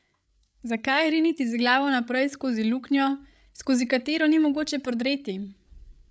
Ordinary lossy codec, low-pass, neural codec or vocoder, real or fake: none; none; codec, 16 kHz, 8 kbps, FreqCodec, larger model; fake